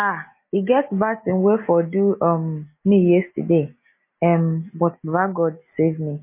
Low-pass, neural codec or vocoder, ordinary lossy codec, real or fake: 3.6 kHz; none; MP3, 24 kbps; real